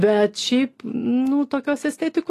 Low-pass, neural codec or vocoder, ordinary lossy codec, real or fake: 14.4 kHz; none; AAC, 48 kbps; real